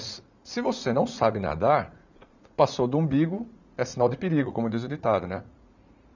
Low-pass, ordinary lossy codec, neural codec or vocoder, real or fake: 7.2 kHz; none; vocoder, 44.1 kHz, 80 mel bands, Vocos; fake